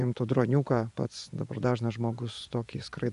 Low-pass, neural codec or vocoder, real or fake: 10.8 kHz; vocoder, 24 kHz, 100 mel bands, Vocos; fake